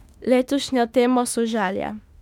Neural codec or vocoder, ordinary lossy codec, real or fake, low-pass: autoencoder, 48 kHz, 32 numbers a frame, DAC-VAE, trained on Japanese speech; none; fake; 19.8 kHz